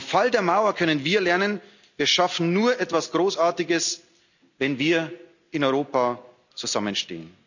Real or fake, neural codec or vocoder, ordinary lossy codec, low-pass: real; none; none; 7.2 kHz